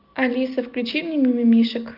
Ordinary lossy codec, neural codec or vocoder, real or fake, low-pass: Opus, 24 kbps; none; real; 5.4 kHz